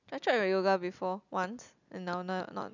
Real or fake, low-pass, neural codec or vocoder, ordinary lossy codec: real; 7.2 kHz; none; none